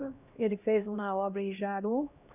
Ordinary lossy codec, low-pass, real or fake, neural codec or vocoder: none; 3.6 kHz; fake; codec, 16 kHz, 1 kbps, X-Codec, HuBERT features, trained on LibriSpeech